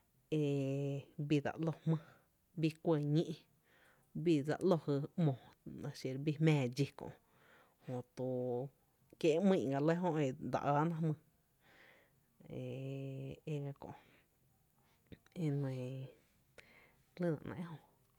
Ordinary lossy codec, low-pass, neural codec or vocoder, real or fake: none; 19.8 kHz; none; real